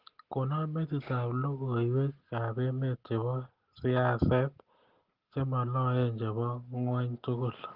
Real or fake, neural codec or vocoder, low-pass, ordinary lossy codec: real; none; 5.4 kHz; Opus, 16 kbps